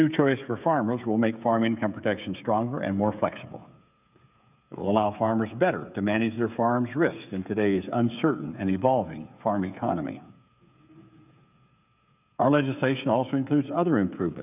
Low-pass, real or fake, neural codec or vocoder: 3.6 kHz; fake; codec, 16 kHz, 8 kbps, FreqCodec, smaller model